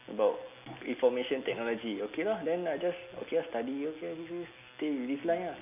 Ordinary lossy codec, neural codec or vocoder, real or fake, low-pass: none; none; real; 3.6 kHz